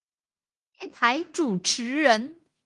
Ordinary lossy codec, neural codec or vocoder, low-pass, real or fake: Opus, 24 kbps; codec, 16 kHz in and 24 kHz out, 0.9 kbps, LongCat-Audio-Codec, fine tuned four codebook decoder; 10.8 kHz; fake